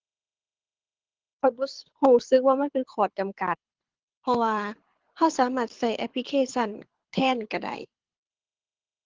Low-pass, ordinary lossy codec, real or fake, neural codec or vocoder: 7.2 kHz; Opus, 16 kbps; fake; codec, 24 kHz, 6 kbps, HILCodec